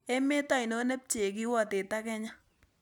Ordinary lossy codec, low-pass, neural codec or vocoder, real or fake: none; 19.8 kHz; none; real